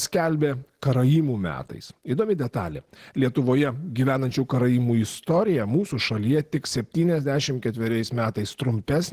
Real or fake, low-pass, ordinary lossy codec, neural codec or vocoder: real; 14.4 kHz; Opus, 16 kbps; none